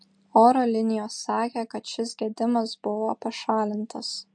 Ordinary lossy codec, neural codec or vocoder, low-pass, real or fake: MP3, 48 kbps; none; 9.9 kHz; real